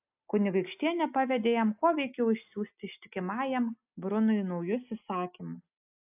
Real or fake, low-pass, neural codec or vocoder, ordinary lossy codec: real; 3.6 kHz; none; AAC, 32 kbps